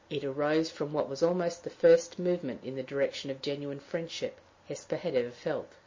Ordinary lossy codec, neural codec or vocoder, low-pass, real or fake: MP3, 32 kbps; none; 7.2 kHz; real